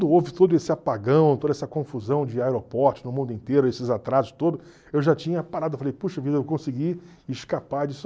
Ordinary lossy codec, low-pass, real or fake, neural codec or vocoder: none; none; real; none